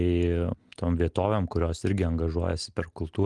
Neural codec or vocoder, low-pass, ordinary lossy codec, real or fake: none; 10.8 kHz; Opus, 24 kbps; real